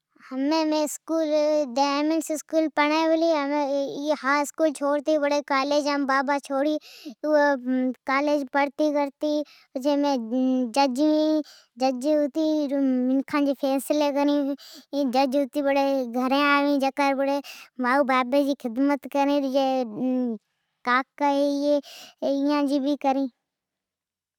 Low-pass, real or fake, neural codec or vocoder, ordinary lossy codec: 19.8 kHz; real; none; none